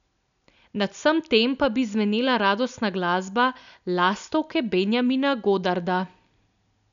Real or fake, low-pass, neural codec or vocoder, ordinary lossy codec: real; 7.2 kHz; none; none